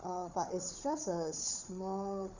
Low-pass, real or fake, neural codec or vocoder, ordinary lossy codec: 7.2 kHz; fake; codec, 16 kHz, 4 kbps, FunCodec, trained on Chinese and English, 50 frames a second; none